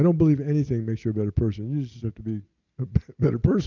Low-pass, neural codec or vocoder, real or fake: 7.2 kHz; none; real